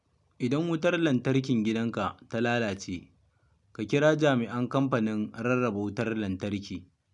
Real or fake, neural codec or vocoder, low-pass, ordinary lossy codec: real; none; 10.8 kHz; none